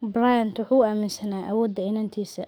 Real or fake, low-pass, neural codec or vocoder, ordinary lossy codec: fake; none; codec, 44.1 kHz, 7.8 kbps, DAC; none